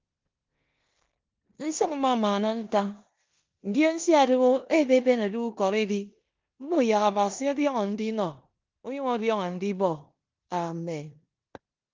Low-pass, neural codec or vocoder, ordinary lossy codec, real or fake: 7.2 kHz; codec, 16 kHz in and 24 kHz out, 0.9 kbps, LongCat-Audio-Codec, four codebook decoder; Opus, 32 kbps; fake